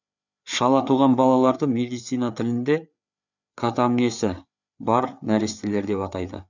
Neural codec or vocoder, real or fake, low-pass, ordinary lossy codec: codec, 16 kHz, 4 kbps, FreqCodec, larger model; fake; 7.2 kHz; none